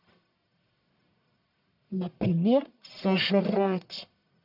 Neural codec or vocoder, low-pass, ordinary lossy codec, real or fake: codec, 44.1 kHz, 1.7 kbps, Pupu-Codec; 5.4 kHz; none; fake